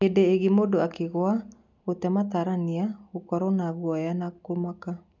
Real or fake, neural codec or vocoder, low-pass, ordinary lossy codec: real; none; 7.2 kHz; none